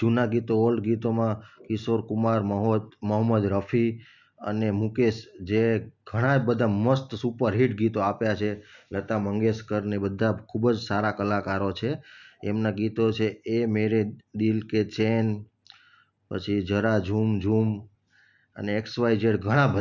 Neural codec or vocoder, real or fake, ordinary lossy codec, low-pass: none; real; none; 7.2 kHz